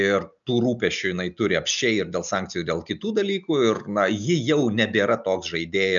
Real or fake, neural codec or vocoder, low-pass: real; none; 7.2 kHz